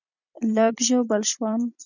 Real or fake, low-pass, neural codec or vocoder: fake; 7.2 kHz; vocoder, 44.1 kHz, 128 mel bands every 256 samples, BigVGAN v2